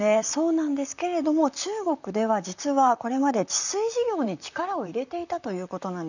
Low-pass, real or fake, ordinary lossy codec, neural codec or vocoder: 7.2 kHz; fake; none; vocoder, 44.1 kHz, 128 mel bands, Pupu-Vocoder